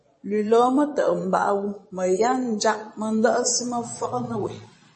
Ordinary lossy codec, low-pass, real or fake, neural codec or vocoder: MP3, 32 kbps; 9.9 kHz; fake; vocoder, 22.05 kHz, 80 mel bands, Vocos